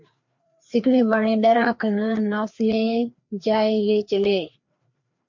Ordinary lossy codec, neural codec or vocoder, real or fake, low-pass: MP3, 48 kbps; codec, 16 kHz, 2 kbps, FreqCodec, larger model; fake; 7.2 kHz